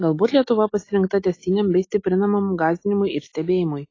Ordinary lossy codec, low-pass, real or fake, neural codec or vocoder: AAC, 32 kbps; 7.2 kHz; real; none